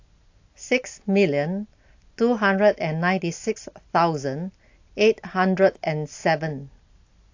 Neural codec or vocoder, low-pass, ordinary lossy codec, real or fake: none; 7.2 kHz; AAC, 48 kbps; real